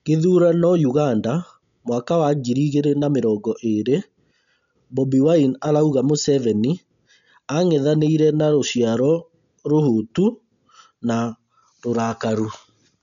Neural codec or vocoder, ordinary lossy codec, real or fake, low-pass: none; none; real; 7.2 kHz